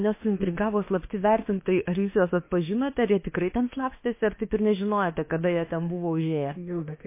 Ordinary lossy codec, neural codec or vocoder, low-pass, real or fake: MP3, 24 kbps; autoencoder, 48 kHz, 32 numbers a frame, DAC-VAE, trained on Japanese speech; 3.6 kHz; fake